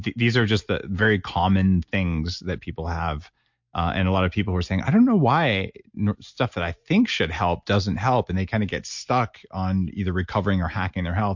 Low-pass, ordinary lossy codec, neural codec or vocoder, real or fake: 7.2 kHz; MP3, 48 kbps; none; real